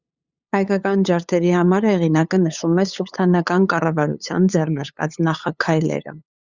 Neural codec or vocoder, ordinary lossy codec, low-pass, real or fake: codec, 16 kHz, 8 kbps, FunCodec, trained on LibriTTS, 25 frames a second; Opus, 64 kbps; 7.2 kHz; fake